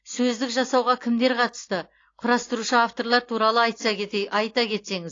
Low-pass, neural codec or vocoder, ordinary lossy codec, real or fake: 7.2 kHz; none; AAC, 32 kbps; real